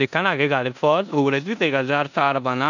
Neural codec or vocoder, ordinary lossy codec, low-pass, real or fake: codec, 16 kHz in and 24 kHz out, 0.9 kbps, LongCat-Audio-Codec, four codebook decoder; none; 7.2 kHz; fake